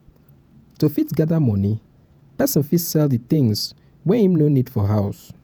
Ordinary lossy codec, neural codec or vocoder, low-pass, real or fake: none; vocoder, 48 kHz, 128 mel bands, Vocos; none; fake